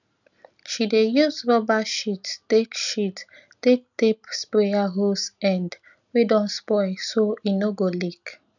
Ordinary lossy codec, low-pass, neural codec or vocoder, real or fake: none; 7.2 kHz; none; real